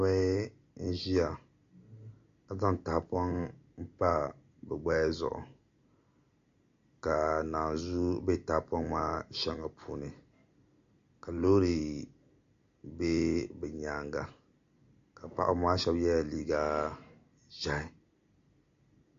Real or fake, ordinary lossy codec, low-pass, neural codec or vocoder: real; MP3, 48 kbps; 7.2 kHz; none